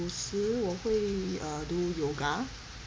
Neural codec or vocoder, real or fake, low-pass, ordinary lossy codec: none; real; none; none